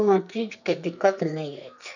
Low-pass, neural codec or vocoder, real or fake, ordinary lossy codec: 7.2 kHz; codec, 44.1 kHz, 2.6 kbps, SNAC; fake; none